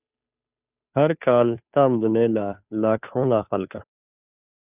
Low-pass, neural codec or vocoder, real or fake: 3.6 kHz; codec, 16 kHz, 2 kbps, FunCodec, trained on Chinese and English, 25 frames a second; fake